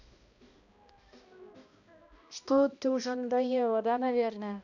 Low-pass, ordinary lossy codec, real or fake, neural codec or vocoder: 7.2 kHz; none; fake; codec, 16 kHz, 1 kbps, X-Codec, HuBERT features, trained on balanced general audio